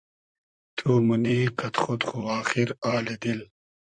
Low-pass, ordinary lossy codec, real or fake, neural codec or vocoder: 9.9 kHz; Opus, 64 kbps; fake; vocoder, 44.1 kHz, 128 mel bands, Pupu-Vocoder